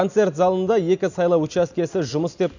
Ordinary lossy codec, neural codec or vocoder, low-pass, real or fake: none; none; 7.2 kHz; real